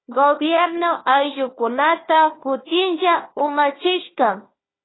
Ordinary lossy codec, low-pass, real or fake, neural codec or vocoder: AAC, 16 kbps; 7.2 kHz; fake; codec, 16 kHz, 1 kbps, FunCodec, trained on Chinese and English, 50 frames a second